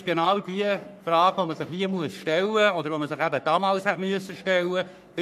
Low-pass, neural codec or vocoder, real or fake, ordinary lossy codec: 14.4 kHz; codec, 44.1 kHz, 3.4 kbps, Pupu-Codec; fake; none